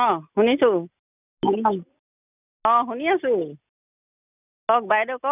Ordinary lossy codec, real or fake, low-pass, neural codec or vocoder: none; real; 3.6 kHz; none